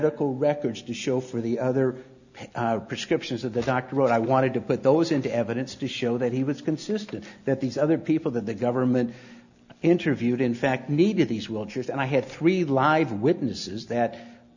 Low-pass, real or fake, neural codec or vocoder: 7.2 kHz; real; none